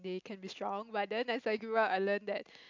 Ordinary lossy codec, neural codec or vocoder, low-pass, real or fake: MP3, 64 kbps; none; 7.2 kHz; real